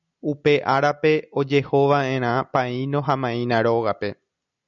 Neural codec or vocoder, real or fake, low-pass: none; real; 7.2 kHz